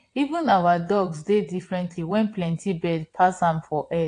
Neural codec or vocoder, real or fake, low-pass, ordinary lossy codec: vocoder, 22.05 kHz, 80 mel bands, WaveNeXt; fake; 9.9 kHz; AAC, 48 kbps